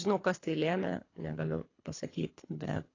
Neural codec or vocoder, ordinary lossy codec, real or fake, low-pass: codec, 24 kHz, 3 kbps, HILCodec; AAC, 32 kbps; fake; 7.2 kHz